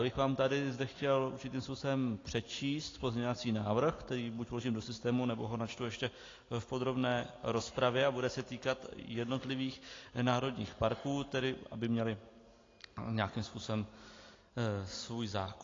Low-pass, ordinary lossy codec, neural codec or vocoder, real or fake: 7.2 kHz; AAC, 32 kbps; none; real